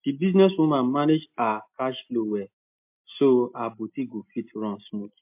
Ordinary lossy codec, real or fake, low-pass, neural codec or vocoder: MP3, 32 kbps; real; 3.6 kHz; none